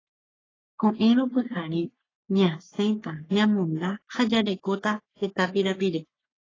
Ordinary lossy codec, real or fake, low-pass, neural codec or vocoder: AAC, 32 kbps; fake; 7.2 kHz; codec, 44.1 kHz, 3.4 kbps, Pupu-Codec